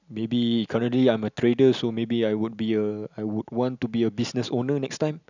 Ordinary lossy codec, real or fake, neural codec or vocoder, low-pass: none; real; none; 7.2 kHz